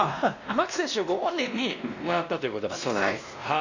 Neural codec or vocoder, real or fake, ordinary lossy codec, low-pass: codec, 16 kHz, 1 kbps, X-Codec, WavLM features, trained on Multilingual LibriSpeech; fake; Opus, 64 kbps; 7.2 kHz